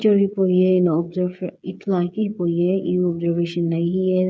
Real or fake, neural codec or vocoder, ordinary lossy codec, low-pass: fake; codec, 16 kHz, 8 kbps, FreqCodec, smaller model; none; none